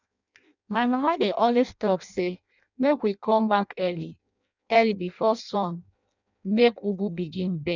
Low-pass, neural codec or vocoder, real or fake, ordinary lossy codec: 7.2 kHz; codec, 16 kHz in and 24 kHz out, 0.6 kbps, FireRedTTS-2 codec; fake; none